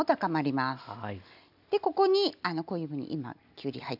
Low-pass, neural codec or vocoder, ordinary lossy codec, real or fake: 5.4 kHz; none; none; real